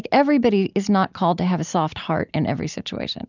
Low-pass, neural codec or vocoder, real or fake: 7.2 kHz; none; real